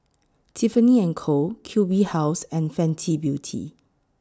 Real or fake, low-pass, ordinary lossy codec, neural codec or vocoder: real; none; none; none